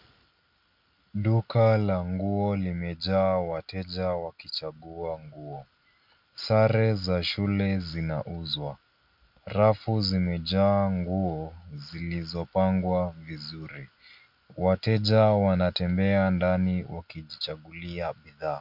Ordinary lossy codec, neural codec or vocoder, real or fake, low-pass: MP3, 48 kbps; none; real; 5.4 kHz